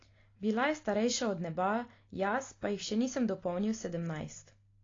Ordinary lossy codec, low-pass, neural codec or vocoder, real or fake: AAC, 32 kbps; 7.2 kHz; none; real